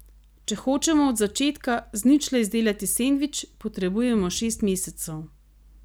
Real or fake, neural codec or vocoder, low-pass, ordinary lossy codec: real; none; none; none